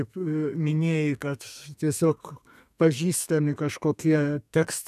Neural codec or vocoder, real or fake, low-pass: codec, 32 kHz, 1.9 kbps, SNAC; fake; 14.4 kHz